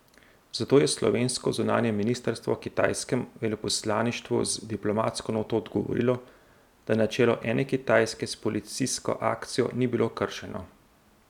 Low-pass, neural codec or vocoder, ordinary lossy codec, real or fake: 19.8 kHz; none; none; real